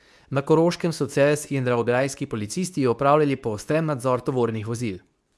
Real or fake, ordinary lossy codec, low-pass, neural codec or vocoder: fake; none; none; codec, 24 kHz, 0.9 kbps, WavTokenizer, medium speech release version 2